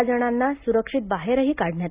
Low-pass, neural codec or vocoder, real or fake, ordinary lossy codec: 3.6 kHz; none; real; Opus, 64 kbps